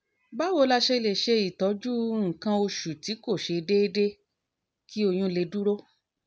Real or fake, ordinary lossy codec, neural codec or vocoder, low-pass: real; none; none; none